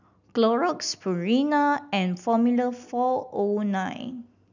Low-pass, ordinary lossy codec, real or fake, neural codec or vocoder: 7.2 kHz; none; real; none